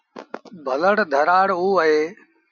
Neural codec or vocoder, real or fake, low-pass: none; real; 7.2 kHz